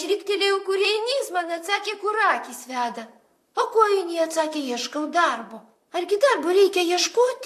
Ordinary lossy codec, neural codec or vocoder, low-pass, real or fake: AAC, 64 kbps; vocoder, 44.1 kHz, 128 mel bands, Pupu-Vocoder; 14.4 kHz; fake